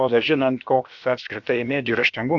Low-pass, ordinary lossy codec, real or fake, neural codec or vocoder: 7.2 kHz; AAC, 64 kbps; fake; codec, 16 kHz, about 1 kbps, DyCAST, with the encoder's durations